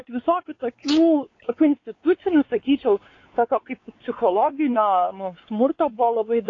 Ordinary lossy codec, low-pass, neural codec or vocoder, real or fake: AAC, 32 kbps; 7.2 kHz; codec, 16 kHz, 4 kbps, X-Codec, WavLM features, trained on Multilingual LibriSpeech; fake